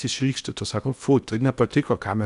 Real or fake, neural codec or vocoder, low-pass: fake; codec, 16 kHz in and 24 kHz out, 0.8 kbps, FocalCodec, streaming, 65536 codes; 10.8 kHz